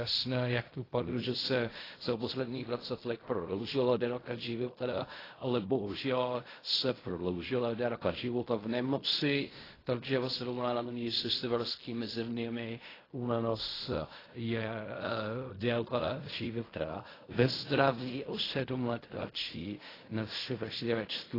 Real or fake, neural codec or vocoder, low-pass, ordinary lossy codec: fake; codec, 16 kHz in and 24 kHz out, 0.4 kbps, LongCat-Audio-Codec, fine tuned four codebook decoder; 5.4 kHz; AAC, 24 kbps